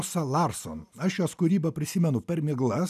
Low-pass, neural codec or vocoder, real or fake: 14.4 kHz; none; real